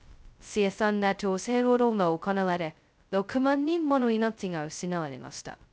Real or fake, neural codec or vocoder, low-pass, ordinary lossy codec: fake; codec, 16 kHz, 0.2 kbps, FocalCodec; none; none